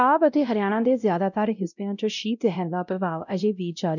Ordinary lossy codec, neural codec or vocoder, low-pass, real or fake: none; codec, 16 kHz, 0.5 kbps, X-Codec, WavLM features, trained on Multilingual LibriSpeech; 7.2 kHz; fake